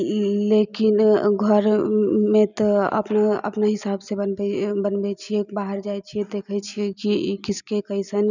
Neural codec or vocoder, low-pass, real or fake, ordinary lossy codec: none; 7.2 kHz; real; none